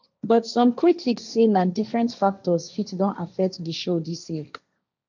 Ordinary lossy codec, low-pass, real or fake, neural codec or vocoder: none; 7.2 kHz; fake; codec, 16 kHz, 1.1 kbps, Voila-Tokenizer